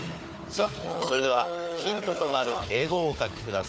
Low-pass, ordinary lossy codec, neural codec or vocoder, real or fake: none; none; codec, 16 kHz, 4 kbps, FunCodec, trained on Chinese and English, 50 frames a second; fake